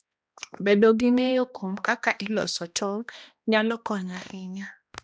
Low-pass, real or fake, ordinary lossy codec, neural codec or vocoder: none; fake; none; codec, 16 kHz, 1 kbps, X-Codec, HuBERT features, trained on balanced general audio